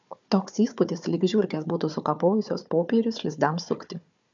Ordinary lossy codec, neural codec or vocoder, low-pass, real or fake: MP3, 64 kbps; codec, 16 kHz, 4 kbps, FunCodec, trained on Chinese and English, 50 frames a second; 7.2 kHz; fake